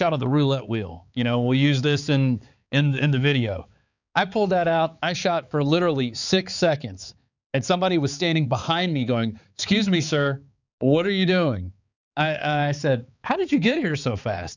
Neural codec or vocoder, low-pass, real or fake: codec, 16 kHz, 4 kbps, X-Codec, HuBERT features, trained on balanced general audio; 7.2 kHz; fake